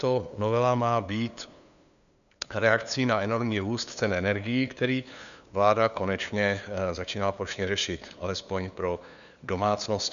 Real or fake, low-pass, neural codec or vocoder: fake; 7.2 kHz; codec, 16 kHz, 2 kbps, FunCodec, trained on LibriTTS, 25 frames a second